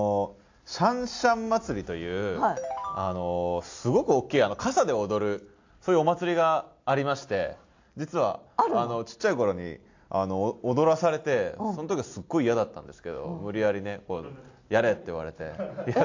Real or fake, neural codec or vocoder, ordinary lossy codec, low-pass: real; none; AAC, 48 kbps; 7.2 kHz